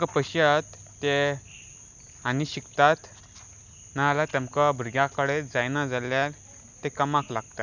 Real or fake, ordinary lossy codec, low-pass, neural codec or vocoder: real; none; 7.2 kHz; none